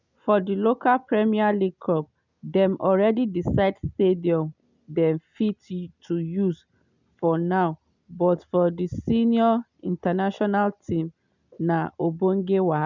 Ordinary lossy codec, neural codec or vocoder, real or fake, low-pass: none; none; real; 7.2 kHz